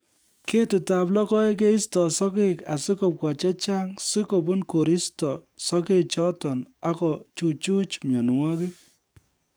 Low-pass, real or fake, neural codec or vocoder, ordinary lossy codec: none; fake; codec, 44.1 kHz, 7.8 kbps, DAC; none